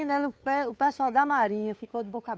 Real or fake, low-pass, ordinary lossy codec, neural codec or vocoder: fake; none; none; codec, 16 kHz, 2 kbps, FunCodec, trained on Chinese and English, 25 frames a second